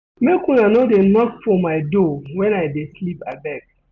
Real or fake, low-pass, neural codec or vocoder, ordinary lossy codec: real; 7.2 kHz; none; none